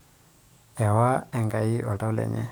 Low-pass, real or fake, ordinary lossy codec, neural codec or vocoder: none; fake; none; codec, 44.1 kHz, 7.8 kbps, DAC